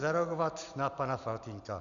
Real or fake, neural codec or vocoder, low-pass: real; none; 7.2 kHz